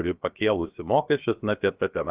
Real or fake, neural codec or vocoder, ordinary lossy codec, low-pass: fake; codec, 16 kHz, 0.7 kbps, FocalCodec; Opus, 24 kbps; 3.6 kHz